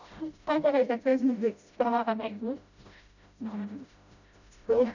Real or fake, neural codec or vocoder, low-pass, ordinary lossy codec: fake; codec, 16 kHz, 0.5 kbps, FreqCodec, smaller model; 7.2 kHz; none